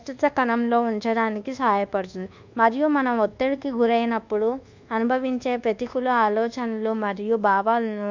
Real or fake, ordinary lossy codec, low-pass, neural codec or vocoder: fake; Opus, 64 kbps; 7.2 kHz; codec, 24 kHz, 1.2 kbps, DualCodec